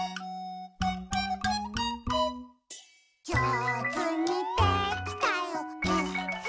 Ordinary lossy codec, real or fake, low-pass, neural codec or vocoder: none; real; none; none